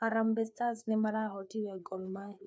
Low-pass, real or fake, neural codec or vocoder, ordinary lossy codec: none; fake; codec, 16 kHz, 4 kbps, FreqCodec, larger model; none